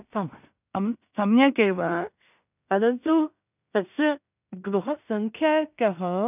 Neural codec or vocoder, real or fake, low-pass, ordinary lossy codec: codec, 16 kHz in and 24 kHz out, 0.4 kbps, LongCat-Audio-Codec, two codebook decoder; fake; 3.6 kHz; none